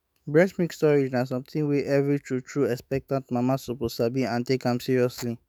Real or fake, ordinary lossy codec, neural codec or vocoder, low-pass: fake; none; autoencoder, 48 kHz, 128 numbers a frame, DAC-VAE, trained on Japanese speech; none